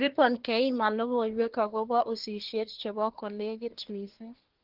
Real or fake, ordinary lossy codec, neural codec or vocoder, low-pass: fake; Opus, 16 kbps; codec, 24 kHz, 1 kbps, SNAC; 5.4 kHz